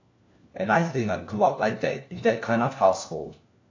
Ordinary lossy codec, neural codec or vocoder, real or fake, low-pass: AAC, 48 kbps; codec, 16 kHz, 1 kbps, FunCodec, trained on LibriTTS, 50 frames a second; fake; 7.2 kHz